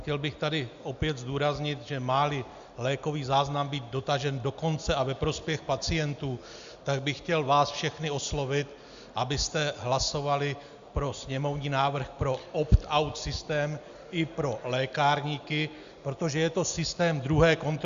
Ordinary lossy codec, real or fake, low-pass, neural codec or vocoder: Opus, 64 kbps; real; 7.2 kHz; none